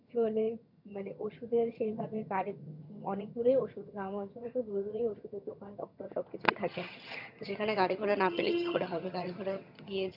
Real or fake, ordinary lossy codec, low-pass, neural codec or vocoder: fake; none; 5.4 kHz; vocoder, 22.05 kHz, 80 mel bands, HiFi-GAN